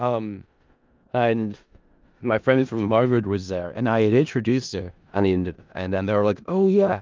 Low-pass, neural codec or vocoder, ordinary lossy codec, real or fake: 7.2 kHz; codec, 16 kHz in and 24 kHz out, 0.4 kbps, LongCat-Audio-Codec, four codebook decoder; Opus, 24 kbps; fake